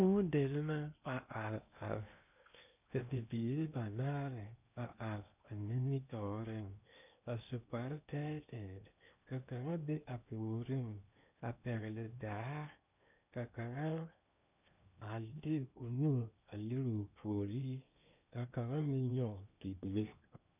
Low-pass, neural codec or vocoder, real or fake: 3.6 kHz; codec, 16 kHz in and 24 kHz out, 0.8 kbps, FocalCodec, streaming, 65536 codes; fake